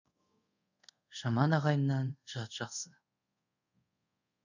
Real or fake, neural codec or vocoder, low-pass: fake; codec, 24 kHz, 0.5 kbps, DualCodec; 7.2 kHz